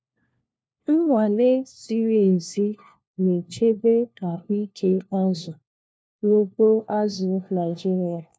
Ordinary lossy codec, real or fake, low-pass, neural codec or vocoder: none; fake; none; codec, 16 kHz, 1 kbps, FunCodec, trained on LibriTTS, 50 frames a second